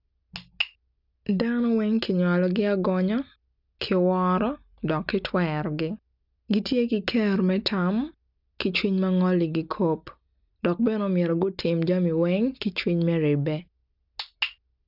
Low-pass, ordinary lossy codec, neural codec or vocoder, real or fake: 5.4 kHz; none; none; real